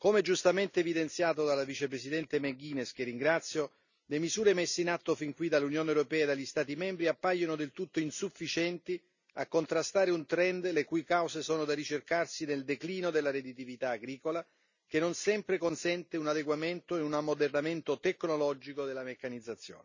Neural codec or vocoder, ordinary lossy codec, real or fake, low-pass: none; none; real; 7.2 kHz